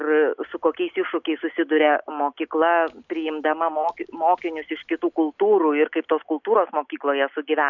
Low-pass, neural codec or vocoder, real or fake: 7.2 kHz; none; real